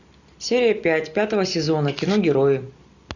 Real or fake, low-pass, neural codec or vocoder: real; 7.2 kHz; none